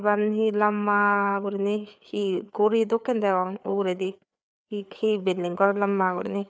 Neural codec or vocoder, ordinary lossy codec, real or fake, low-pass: codec, 16 kHz, 4 kbps, FreqCodec, larger model; none; fake; none